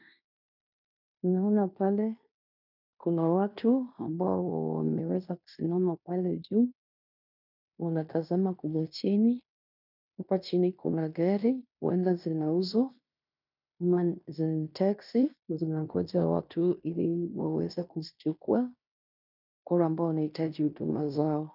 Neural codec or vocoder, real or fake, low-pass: codec, 16 kHz in and 24 kHz out, 0.9 kbps, LongCat-Audio-Codec, fine tuned four codebook decoder; fake; 5.4 kHz